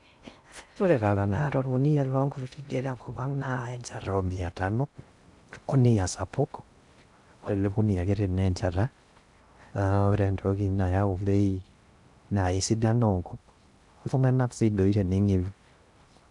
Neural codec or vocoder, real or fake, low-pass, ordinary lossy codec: codec, 16 kHz in and 24 kHz out, 0.6 kbps, FocalCodec, streaming, 2048 codes; fake; 10.8 kHz; none